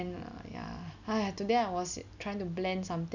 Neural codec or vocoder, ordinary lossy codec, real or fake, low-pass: none; none; real; 7.2 kHz